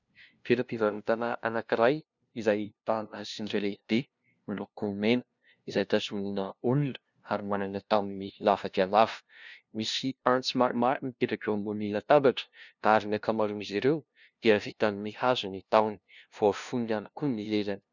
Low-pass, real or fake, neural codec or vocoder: 7.2 kHz; fake; codec, 16 kHz, 0.5 kbps, FunCodec, trained on LibriTTS, 25 frames a second